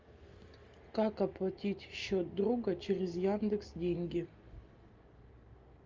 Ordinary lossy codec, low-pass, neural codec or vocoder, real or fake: Opus, 32 kbps; 7.2 kHz; none; real